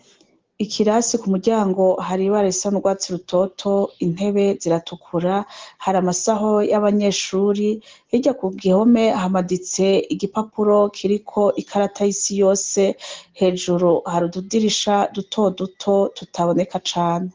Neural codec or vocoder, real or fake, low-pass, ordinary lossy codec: none; real; 7.2 kHz; Opus, 16 kbps